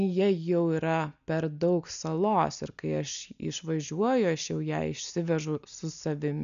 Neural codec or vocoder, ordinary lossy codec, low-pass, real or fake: none; MP3, 96 kbps; 7.2 kHz; real